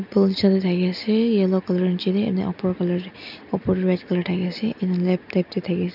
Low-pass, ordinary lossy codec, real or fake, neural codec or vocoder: 5.4 kHz; none; real; none